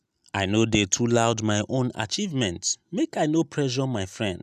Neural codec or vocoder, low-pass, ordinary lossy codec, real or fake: none; 14.4 kHz; none; real